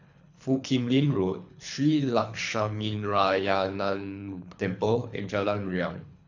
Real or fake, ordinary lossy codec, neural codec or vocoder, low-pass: fake; AAC, 48 kbps; codec, 24 kHz, 3 kbps, HILCodec; 7.2 kHz